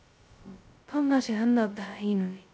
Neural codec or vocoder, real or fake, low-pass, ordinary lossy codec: codec, 16 kHz, 0.2 kbps, FocalCodec; fake; none; none